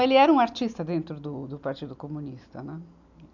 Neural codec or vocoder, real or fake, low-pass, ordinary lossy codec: none; real; 7.2 kHz; none